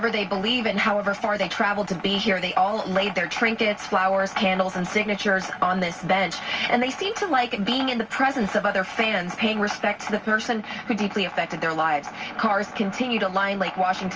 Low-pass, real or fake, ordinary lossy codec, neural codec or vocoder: 7.2 kHz; real; Opus, 24 kbps; none